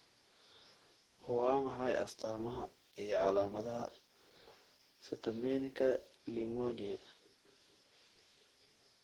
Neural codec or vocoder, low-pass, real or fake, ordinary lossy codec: codec, 44.1 kHz, 2.6 kbps, DAC; 19.8 kHz; fake; Opus, 16 kbps